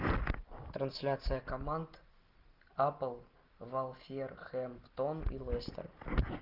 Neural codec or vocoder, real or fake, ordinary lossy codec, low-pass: none; real; Opus, 32 kbps; 5.4 kHz